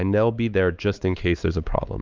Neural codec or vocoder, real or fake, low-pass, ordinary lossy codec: codec, 16 kHz, 4 kbps, X-Codec, HuBERT features, trained on LibriSpeech; fake; 7.2 kHz; Opus, 24 kbps